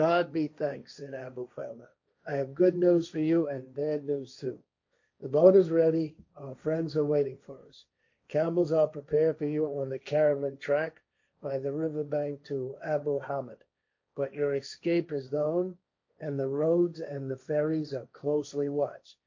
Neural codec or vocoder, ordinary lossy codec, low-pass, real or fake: codec, 16 kHz, 1.1 kbps, Voila-Tokenizer; MP3, 48 kbps; 7.2 kHz; fake